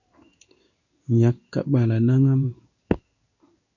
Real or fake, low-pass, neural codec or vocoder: fake; 7.2 kHz; codec, 16 kHz in and 24 kHz out, 1 kbps, XY-Tokenizer